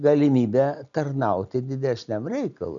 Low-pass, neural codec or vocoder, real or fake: 7.2 kHz; none; real